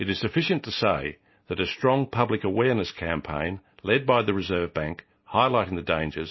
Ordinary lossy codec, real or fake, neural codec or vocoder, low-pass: MP3, 24 kbps; real; none; 7.2 kHz